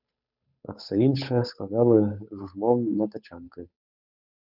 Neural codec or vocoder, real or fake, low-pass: codec, 16 kHz, 8 kbps, FunCodec, trained on Chinese and English, 25 frames a second; fake; 5.4 kHz